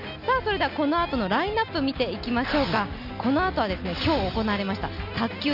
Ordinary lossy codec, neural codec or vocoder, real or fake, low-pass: none; none; real; 5.4 kHz